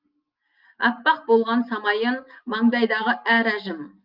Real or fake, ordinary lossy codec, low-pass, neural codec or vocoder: real; Opus, 32 kbps; 5.4 kHz; none